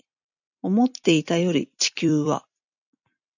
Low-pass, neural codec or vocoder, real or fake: 7.2 kHz; vocoder, 44.1 kHz, 128 mel bands every 256 samples, BigVGAN v2; fake